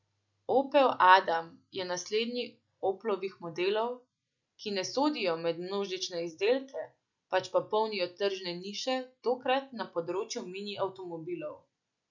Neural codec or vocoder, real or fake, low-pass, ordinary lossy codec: none; real; 7.2 kHz; none